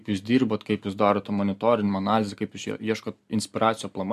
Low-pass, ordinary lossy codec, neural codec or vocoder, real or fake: 14.4 kHz; MP3, 96 kbps; none; real